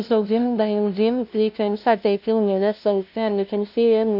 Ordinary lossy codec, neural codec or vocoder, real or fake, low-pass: none; codec, 16 kHz, 0.5 kbps, FunCodec, trained on LibriTTS, 25 frames a second; fake; 5.4 kHz